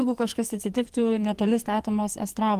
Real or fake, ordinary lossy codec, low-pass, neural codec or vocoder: fake; Opus, 24 kbps; 14.4 kHz; codec, 44.1 kHz, 2.6 kbps, SNAC